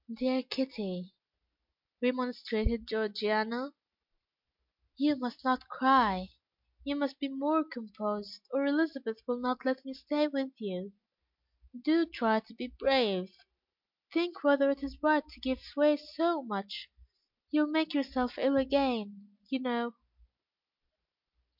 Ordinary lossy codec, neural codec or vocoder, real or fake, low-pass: MP3, 48 kbps; none; real; 5.4 kHz